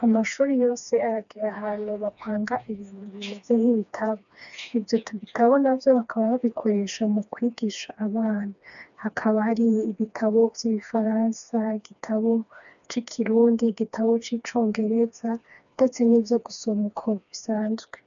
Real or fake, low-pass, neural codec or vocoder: fake; 7.2 kHz; codec, 16 kHz, 2 kbps, FreqCodec, smaller model